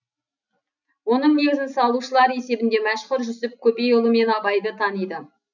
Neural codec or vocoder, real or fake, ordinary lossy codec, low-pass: none; real; none; 7.2 kHz